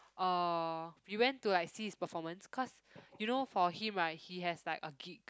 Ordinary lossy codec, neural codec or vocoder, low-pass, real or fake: none; none; none; real